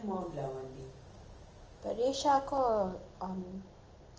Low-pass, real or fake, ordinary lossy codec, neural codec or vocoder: 7.2 kHz; real; Opus, 24 kbps; none